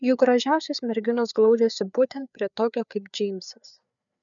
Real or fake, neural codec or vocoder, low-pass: fake; codec, 16 kHz, 4 kbps, FreqCodec, larger model; 7.2 kHz